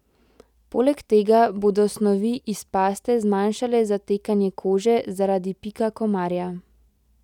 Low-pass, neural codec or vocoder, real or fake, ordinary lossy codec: 19.8 kHz; none; real; none